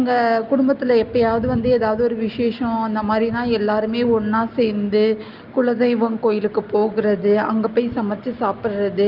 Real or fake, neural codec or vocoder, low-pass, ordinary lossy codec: real; none; 5.4 kHz; Opus, 24 kbps